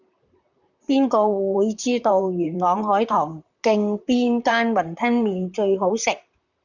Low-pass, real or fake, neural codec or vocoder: 7.2 kHz; fake; vocoder, 44.1 kHz, 128 mel bands, Pupu-Vocoder